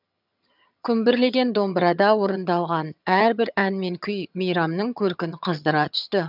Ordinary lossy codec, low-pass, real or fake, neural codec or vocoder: none; 5.4 kHz; fake; vocoder, 22.05 kHz, 80 mel bands, HiFi-GAN